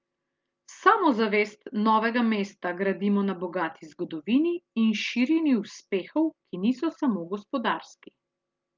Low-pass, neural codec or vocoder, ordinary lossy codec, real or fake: 7.2 kHz; none; Opus, 32 kbps; real